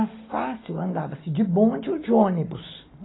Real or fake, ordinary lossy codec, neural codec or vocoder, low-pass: real; AAC, 16 kbps; none; 7.2 kHz